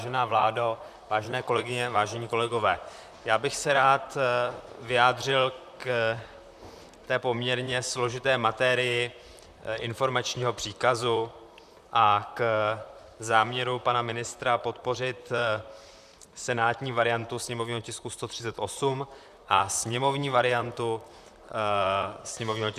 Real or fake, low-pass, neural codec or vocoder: fake; 14.4 kHz; vocoder, 44.1 kHz, 128 mel bands, Pupu-Vocoder